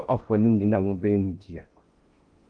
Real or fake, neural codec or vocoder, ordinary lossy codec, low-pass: fake; codec, 16 kHz in and 24 kHz out, 0.6 kbps, FocalCodec, streaming, 4096 codes; Opus, 24 kbps; 9.9 kHz